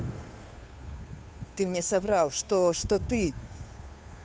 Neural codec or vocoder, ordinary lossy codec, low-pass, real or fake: codec, 16 kHz, 2 kbps, FunCodec, trained on Chinese and English, 25 frames a second; none; none; fake